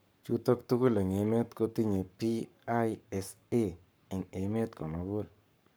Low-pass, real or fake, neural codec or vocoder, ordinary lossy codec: none; fake; codec, 44.1 kHz, 7.8 kbps, Pupu-Codec; none